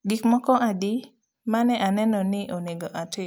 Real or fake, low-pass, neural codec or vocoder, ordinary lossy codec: real; none; none; none